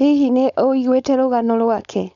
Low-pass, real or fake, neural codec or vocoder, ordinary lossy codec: 7.2 kHz; fake; codec, 16 kHz, 4.8 kbps, FACodec; none